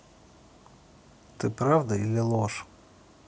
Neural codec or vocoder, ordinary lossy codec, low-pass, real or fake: none; none; none; real